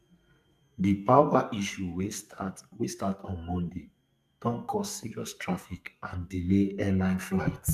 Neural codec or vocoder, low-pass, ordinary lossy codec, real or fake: codec, 44.1 kHz, 2.6 kbps, SNAC; 14.4 kHz; MP3, 96 kbps; fake